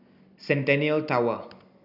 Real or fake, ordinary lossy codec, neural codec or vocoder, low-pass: real; none; none; 5.4 kHz